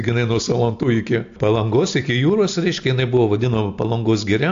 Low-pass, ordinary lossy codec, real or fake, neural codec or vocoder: 7.2 kHz; AAC, 64 kbps; real; none